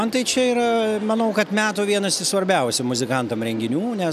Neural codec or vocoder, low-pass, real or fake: none; 14.4 kHz; real